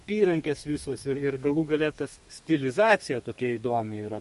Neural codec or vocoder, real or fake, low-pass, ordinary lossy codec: codec, 32 kHz, 1.9 kbps, SNAC; fake; 14.4 kHz; MP3, 48 kbps